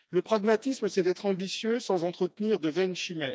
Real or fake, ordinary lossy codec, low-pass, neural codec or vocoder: fake; none; none; codec, 16 kHz, 2 kbps, FreqCodec, smaller model